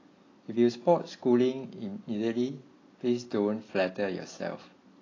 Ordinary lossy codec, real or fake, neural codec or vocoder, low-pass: AAC, 32 kbps; real; none; 7.2 kHz